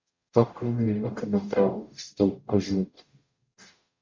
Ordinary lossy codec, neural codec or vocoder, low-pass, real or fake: MP3, 48 kbps; codec, 44.1 kHz, 0.9 kbps, DAC; 7.2 kHz; fake